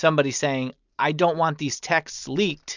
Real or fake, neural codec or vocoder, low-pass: real; none; 7.2 kHz